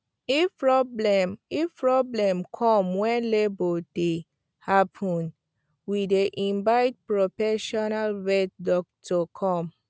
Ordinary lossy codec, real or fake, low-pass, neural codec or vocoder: none; real; none; none